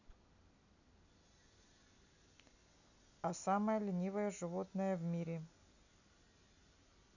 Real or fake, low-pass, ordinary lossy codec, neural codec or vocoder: real; 7.2 kHz; none; none